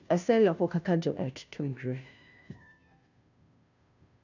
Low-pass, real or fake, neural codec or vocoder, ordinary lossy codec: 7.2 kHz; fake; codec, 16 kHz, 0.5 kbps, FunCodec, trained on Chinese and English, 25 frames a second; none